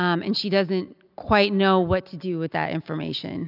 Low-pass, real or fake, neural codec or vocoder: 5.4 kHz; real; none